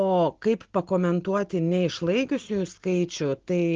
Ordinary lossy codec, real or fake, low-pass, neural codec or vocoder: Opus, 16 kbps; real; 7.2 kHz; none